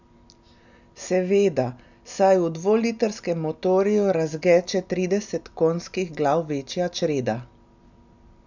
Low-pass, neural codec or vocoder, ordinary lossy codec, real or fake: 7.2 kHz; none; none; real